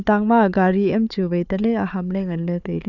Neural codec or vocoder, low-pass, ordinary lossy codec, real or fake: codec, 16 kHz, 4 kbps, FunCodec, trained on Chinese and English, 50 frames a second; 7.2 kHz; none; fake